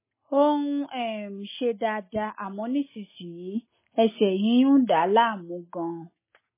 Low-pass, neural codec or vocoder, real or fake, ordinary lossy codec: 3.6 kHz; none; real; MP3, 16 kbps